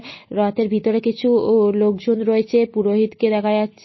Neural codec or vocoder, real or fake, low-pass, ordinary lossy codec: none; real; 7.2 kHz; MP3, 24 kbps